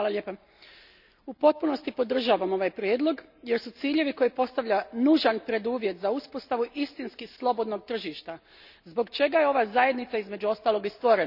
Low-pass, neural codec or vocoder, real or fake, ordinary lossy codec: 5.4 kHz; none; real; none